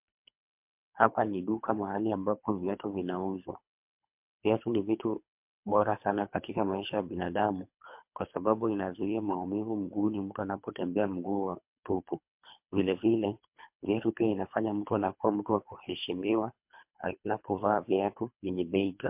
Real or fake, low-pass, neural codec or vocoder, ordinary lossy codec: fake; 3.6 kHz; codec, 24 kHz, 3 kbps, HILCodec; MP3, 32 kbps